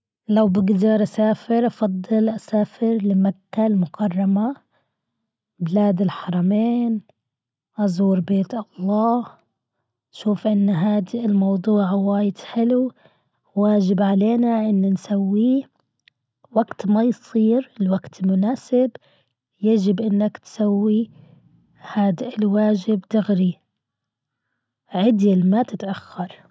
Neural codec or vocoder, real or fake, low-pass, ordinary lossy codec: none; real; none; none